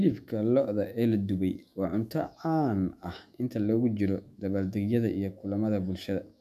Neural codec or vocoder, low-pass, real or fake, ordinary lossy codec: autoencoder, 48 kHz, 128 numbers a frame, DAC-VAE, trained on Japanese speech; 14.4 kHz; fake; none